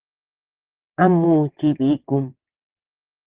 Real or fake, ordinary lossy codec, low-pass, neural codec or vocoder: fake; Opus, 16 kbps; 3.6 kHz; vocoder, 22.05 kHz, 80 mel bands, WaveNeXt